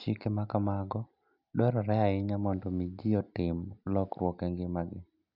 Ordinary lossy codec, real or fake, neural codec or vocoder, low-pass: none; real; none; 5.4 kHz